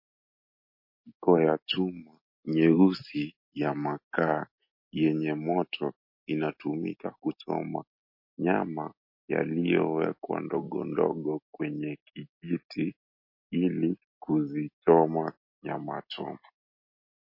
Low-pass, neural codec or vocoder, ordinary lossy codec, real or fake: 5.4 kHz; none; MP3, 32 kbps; real